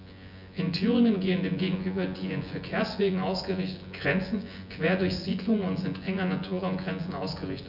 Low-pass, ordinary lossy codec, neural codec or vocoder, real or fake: 5.4 kHz; none; vocoder, 24 kHz, 100 mel bands, Vocos; fake